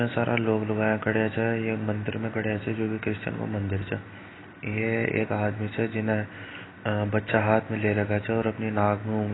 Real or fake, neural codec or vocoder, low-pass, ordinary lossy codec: real; none; 7.2 kHz; AAC, 16 kbps